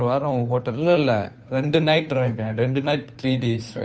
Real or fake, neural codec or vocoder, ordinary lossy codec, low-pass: fake; codec, 16 kHz, 2 kbps, FunCodec, trained on Chinese and English, 25 frames a second; none; none